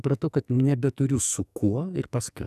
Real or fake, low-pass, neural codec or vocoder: fake; 14.4 kHz; codec, 44.1 kHz, 2.6 kbps, SNAC